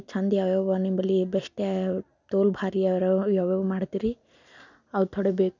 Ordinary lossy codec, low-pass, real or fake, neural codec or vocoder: none; 7.2 kHz; real; none